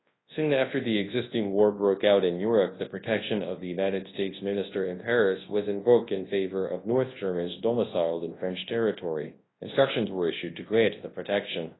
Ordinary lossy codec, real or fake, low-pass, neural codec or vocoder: AAC, 16 kbps; fake; 7.2 kHz; codec, 24 kHz, 0.9 kbps, WavTokenizer, large speech release